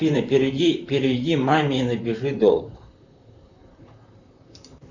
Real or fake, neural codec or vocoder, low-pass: fake; vocoder, 44.1 kHz, 128 mel bands, Pupu-Vocoder; 7.2 kHz